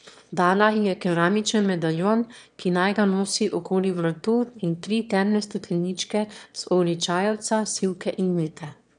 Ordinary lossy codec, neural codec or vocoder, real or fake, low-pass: none; autoencoder, 22.05 kHz, a latent of 192 numbers a frame, VITS, trained on one speaker; fake; 9.9 kHz